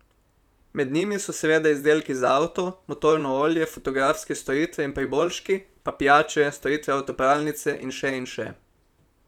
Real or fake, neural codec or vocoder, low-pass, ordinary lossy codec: fake; vocoder, 44.1 kHz, 128 mel bands, Pupu-Vocoder; 19.8 kHz; none